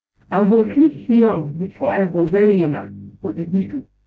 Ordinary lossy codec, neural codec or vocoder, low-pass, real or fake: none; codec, 16 kHz, 0.5 kbps, FreqCodec, smaller model; none; fake